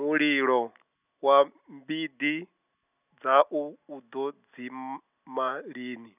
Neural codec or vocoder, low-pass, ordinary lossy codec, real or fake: none; 3.6 kHz; none; real